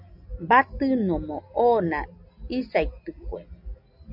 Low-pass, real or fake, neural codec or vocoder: 5.4 kHz; real; none